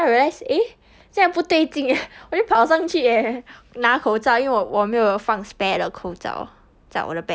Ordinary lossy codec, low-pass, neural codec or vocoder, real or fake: none; none; none; real